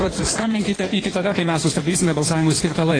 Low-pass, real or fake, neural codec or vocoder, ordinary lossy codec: 9.9 kHz; fake; codec, 16 kHz in and 24 kHz out, 1.1 kbps, FireRedTTS-2 codec; AAC, 32 kbps